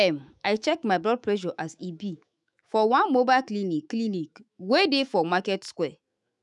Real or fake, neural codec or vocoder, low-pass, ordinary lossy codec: fake; autoencoder, 48 kHz, 128 numbers a frame, DAC-VAE, trained on Japanese speech; 10.8 kHz; none